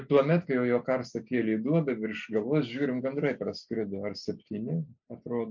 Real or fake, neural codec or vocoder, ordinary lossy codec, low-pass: real; none; MP3, 64 kbps; 7.2 kHz